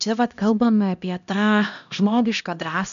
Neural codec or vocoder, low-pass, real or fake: codec, 16 kHz, 1 kbps, X-Codec, HuBERT features, trained on LibriSpeech; 7.2 kHz; fake